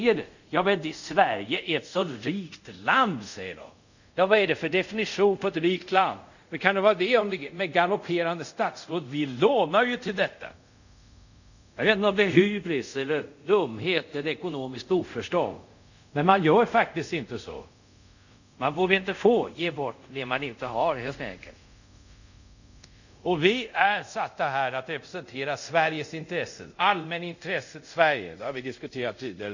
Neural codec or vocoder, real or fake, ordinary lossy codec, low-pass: codec, 24 kHz, 0.5 kbps, DualCodec; fake; none; 7.2 kHz